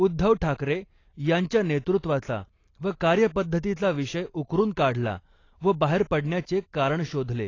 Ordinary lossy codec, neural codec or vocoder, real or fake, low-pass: AAC, 32 kbps; none; real; 7.2 kHz